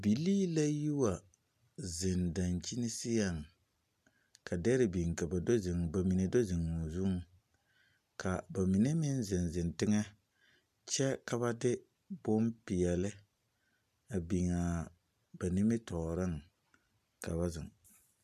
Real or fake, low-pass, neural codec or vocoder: real; 14.4 kHz; none